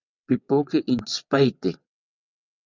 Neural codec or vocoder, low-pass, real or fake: vocoder, 22.05 kHz, 80 mel bands, WaveNeXt; 7.2 kHz; fake